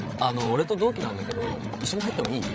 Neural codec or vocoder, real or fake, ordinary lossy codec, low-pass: codec, 16 kHz, 16 kbps, FreqCodec, larger model; fake; none; none